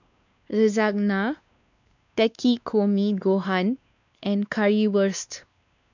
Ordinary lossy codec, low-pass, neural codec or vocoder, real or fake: none; 7.2 kHz; codec, 16 kHz, 2 kbps, X-Codec, WavLM features, trained on Multilingual LibriSpeech; fake